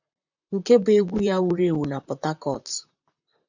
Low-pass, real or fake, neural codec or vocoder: 7.2 kHz; fake; vocoder, 44.1 kHz, 128 mel bands, Pupu-Vocoder